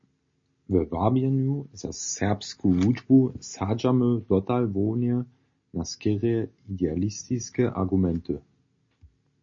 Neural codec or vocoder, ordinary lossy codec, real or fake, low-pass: none; MP3, 32 kbps; real; 7.2 kHz